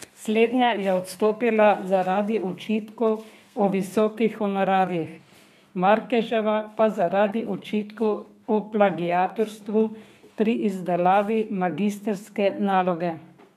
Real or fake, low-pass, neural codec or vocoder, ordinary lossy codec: fake; 14.4 kHz; codec, 32 kHz, 1.9 kbps, SNAC; MP3, 96 kbps